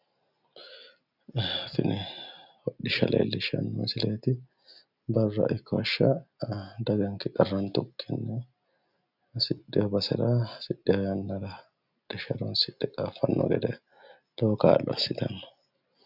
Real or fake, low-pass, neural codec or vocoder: real; 5.4 kHz; none